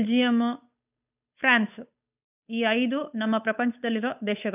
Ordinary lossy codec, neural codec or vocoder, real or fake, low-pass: none; codec, 16 kHz, 8 kbps, FunCodec, trained on Chinese and English, 25 frames a second; fake; 3.6 kHz